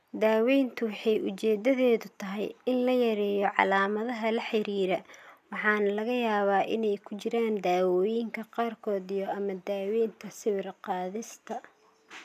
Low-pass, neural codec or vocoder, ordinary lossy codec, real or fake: 14.4 kHz; none; none; real